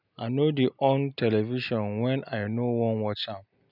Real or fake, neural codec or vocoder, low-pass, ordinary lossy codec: real; none; 5.4 kHz; none